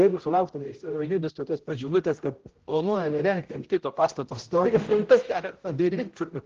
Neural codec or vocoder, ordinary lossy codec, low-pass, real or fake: codec, 16 kHz, 0.5 kbps, X-Codec, HuBERT features, trained on general audio; Opus, 16 kbps; 7.2 kHz; fake